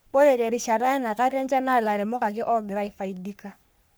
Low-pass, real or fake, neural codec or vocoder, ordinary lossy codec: none; fake; codec, 44.1 kHz, 3.4 kbps, Pupu-Codec; none